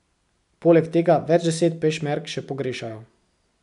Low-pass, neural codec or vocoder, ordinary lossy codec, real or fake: 10.8 kHz; none; none; real